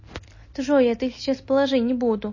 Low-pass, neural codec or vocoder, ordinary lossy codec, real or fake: 7.2 kHz; none; MP3, 32 kbps; real